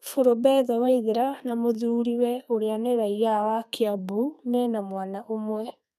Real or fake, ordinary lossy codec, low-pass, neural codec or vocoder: fake; none; 14.4 kHz; codec, 32 kHz, 1.9 kbps, SNAC